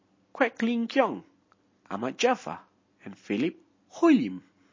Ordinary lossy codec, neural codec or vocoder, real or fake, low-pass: MP3, 32 kbps; none; real; 7.2 kHz